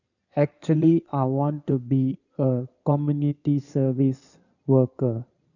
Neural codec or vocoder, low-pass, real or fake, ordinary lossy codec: codec, 16 kHz in and 24 kHz out, 2.2 kbps, FireRedTTS-2 codec; 7.2 kHz; fake; none